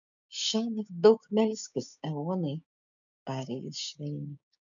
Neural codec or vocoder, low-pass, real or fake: codec, 16 kHz, 6 kbps, DAC; 7.2 kHz; fake